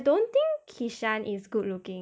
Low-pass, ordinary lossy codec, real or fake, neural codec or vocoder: none; none; real; none